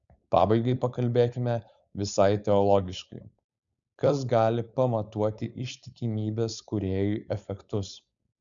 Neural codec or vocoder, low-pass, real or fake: codec, 16 kHz, 4.8 kbps, FACodec; 7.2 kHz; fake